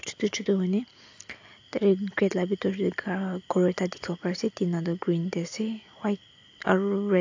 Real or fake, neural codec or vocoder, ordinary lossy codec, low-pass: real; none; AAC, 48 kbps; 7.2 kHz